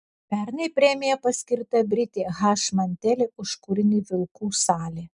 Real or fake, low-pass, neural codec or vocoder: real; 10.8 kHz; none